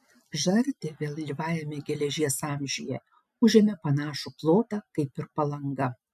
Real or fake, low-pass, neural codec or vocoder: real; 14.4 kHz; none